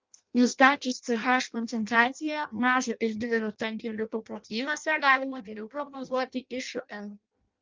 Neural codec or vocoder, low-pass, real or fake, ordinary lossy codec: codec, 16 kHz in and 24 kHz out, 0.6 kbps, FireRedTTS-2 codec; 7.2 kHz; fake; Opus, 24 kbps